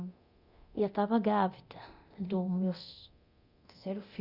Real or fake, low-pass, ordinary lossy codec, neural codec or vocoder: fake; 5.4 kHz; Opus, 64 kbps; codec, 24 kHz, 0.9 kbps, DualCodec